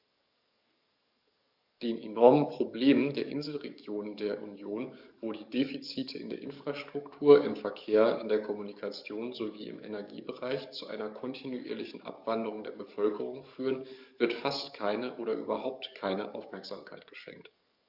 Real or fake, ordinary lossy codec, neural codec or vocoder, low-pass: fake; none; codec, 44.1 kHz, 7.8 kbps, DAC; 5.4 kHz